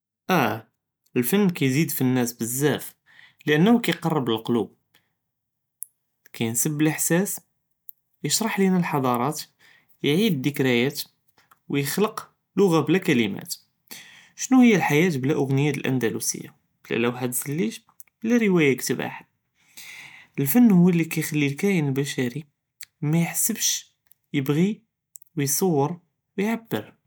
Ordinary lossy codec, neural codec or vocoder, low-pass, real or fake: none; none; none; real